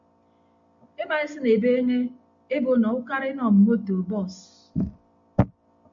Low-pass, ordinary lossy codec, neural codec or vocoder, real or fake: 7.2 kHz; MP3, 64 kbps; none; real